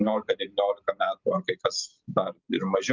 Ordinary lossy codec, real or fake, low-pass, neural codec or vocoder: Opus, 32 kbps; real; 7.2 kHz; none